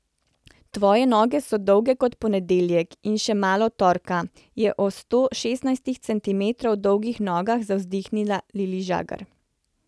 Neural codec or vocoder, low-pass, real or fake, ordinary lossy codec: none; none; real; none